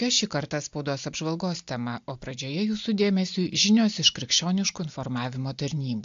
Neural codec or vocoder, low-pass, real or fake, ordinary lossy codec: none; 7.2 kHz; real; AAC, 64 kbps